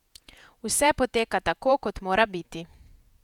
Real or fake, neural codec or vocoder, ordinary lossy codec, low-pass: fake; vocoder, 48 kHz, 128 mel bands, Vocos; none; 19.8 kHz